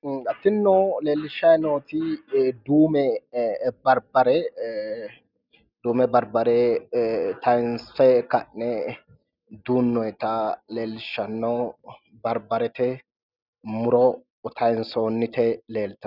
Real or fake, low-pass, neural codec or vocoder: real; 5.4 kHz; none